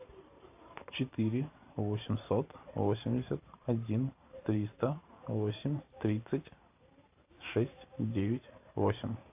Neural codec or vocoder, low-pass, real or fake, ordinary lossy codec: vocoder, 44.1 kHz, 128 mel bands every 512 samples, BigVGAN v2; 3.6 kHz; fake; AAC, 32 kbps